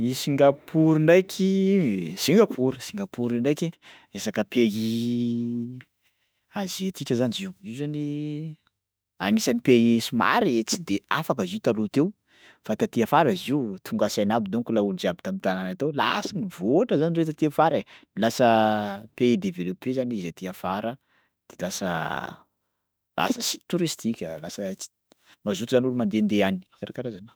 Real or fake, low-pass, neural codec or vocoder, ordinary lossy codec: fake; none; autoencoder, 48 kHz, 32 numbers a frame, DAC-VAE, trained on Japanese speech; none